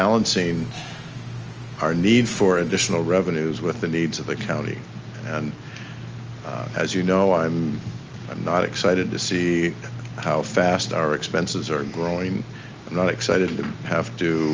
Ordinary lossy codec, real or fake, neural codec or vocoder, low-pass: Opus, 24 kbps; real; none; 7.2 kHz